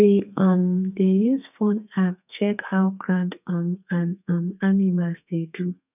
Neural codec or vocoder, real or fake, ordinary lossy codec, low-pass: codec, 32 kHz, 1.9 kbps, SNAC; fake; none; 3.6 kHz